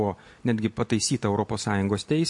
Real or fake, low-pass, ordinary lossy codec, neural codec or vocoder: real; 10.8 kHz; MP3, 48 kbps; none